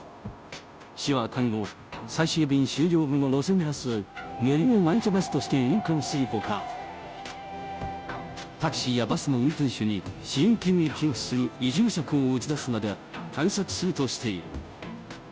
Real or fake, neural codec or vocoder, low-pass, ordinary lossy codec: fake; codec, 16 kHz, 0.5 kbps, FunCodec, trained on Chinese and English, 25 frames a second; none; none